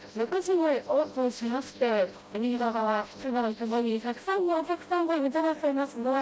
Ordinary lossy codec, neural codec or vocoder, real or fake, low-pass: none; codec, 16 kHz, 0.5 kbps, FreqCodec, smaller model; fake; none